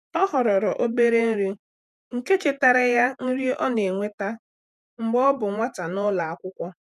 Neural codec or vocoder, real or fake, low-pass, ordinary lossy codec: vocoder, 48 kHz, 128 mel bands, Vocos; fake; 14.4 kHz; none